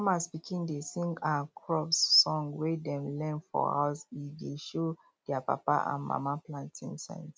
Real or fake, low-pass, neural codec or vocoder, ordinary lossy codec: real; none; none; none